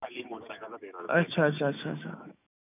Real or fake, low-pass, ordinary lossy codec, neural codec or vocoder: fake; 3.6 kHz; none; autoencoder, 48 kHz, 128 numbers a frame, DAC-VAE, trained on Japanese speech